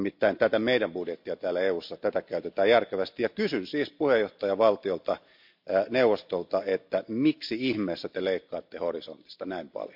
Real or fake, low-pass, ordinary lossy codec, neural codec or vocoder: real; 5.4 kHz; MP3, 48 kbps; none